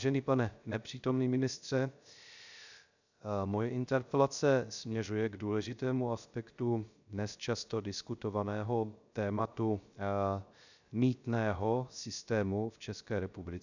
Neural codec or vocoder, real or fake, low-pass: codec, 16 kHz, 0.3 kbps, FocalCodec; fake; 7.2 kHz